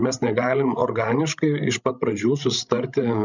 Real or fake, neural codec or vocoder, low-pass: fake; codec, 16 kHz, 16 kbps, FreqCodec, larger model; 7.2 kHz